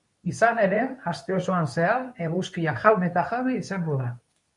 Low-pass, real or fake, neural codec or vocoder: 10.8 kHz; fake; codec, 24 kHz, 0.9 kbps, WavTokenizer, medium speech release version 2